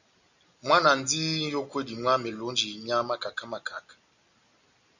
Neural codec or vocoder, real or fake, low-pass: none; real; 7.2 kHz